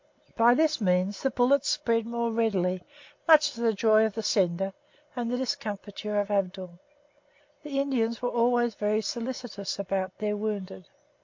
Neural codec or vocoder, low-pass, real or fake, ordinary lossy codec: none; 7.2 kHz; real; MP3, 48 kbps